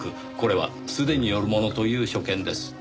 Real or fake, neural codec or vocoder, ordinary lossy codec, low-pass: real; none; none; none